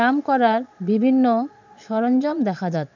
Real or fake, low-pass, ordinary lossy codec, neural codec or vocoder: fake; 7.2 kHz; none; vocoder, 22.05 kHz, 80 mel bands, Vocos